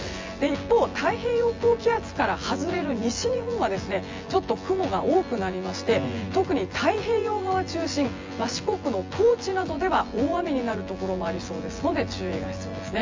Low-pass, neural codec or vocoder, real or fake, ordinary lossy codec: 7.2 kHz; vocoder, 24 kHz, 100 mel bands, Vocos; fake; Opus, 32 kbps